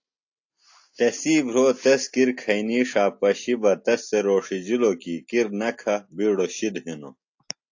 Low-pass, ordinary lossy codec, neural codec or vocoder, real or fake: 7.2 kHz; AAC, 48 kbps; none; real